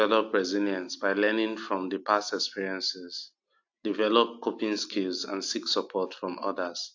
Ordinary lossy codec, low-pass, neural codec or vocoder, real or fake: AAC, 48 kbps; 7.2 kHz; none; real